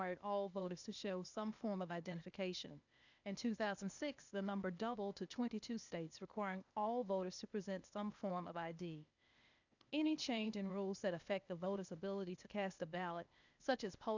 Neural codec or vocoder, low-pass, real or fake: codec, 16 kHz, 0.8 kbps, ZipCodec; 7.2 kHz; fake